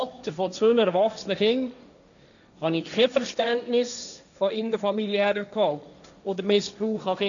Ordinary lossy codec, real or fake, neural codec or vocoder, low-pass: none; fake; codec, 16 kHz, 1.1 kbps, Voila-Tokenizer; 7.2 kHz